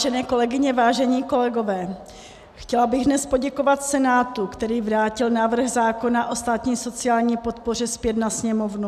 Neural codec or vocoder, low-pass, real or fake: none; 14.4 kHz; real